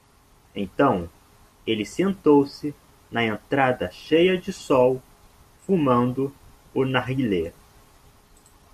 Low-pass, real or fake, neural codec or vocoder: 14.4 kHz; real; none